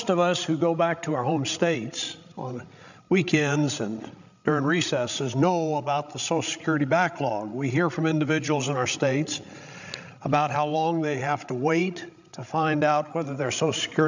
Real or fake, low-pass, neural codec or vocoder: fake; 7.2 kHz; codec, 16 kHz, 16 kbps, FreqCodec, larger model